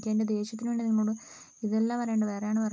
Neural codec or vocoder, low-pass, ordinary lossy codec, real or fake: none; none; none; real